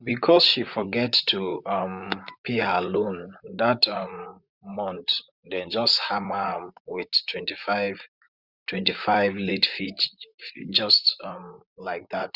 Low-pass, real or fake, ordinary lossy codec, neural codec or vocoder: 5.4 kHz; fake; Opus, 64 kbps; vocoder, 44.1 kHz, 128 mel bands, Pupu-Vocoder